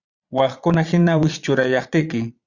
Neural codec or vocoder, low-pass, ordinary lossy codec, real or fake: vocoder, 22.05 kHz, 80 mel bands, Vocos; 7.2 kHz; Opus, 64 kbps; fake